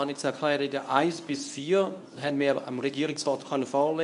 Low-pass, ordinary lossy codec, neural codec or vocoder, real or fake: 10.8 kHz; AAC, 96 kbps; codec, 24 kHz, 0.9 kbps, WavTokenizer, medium speech release version 1; fake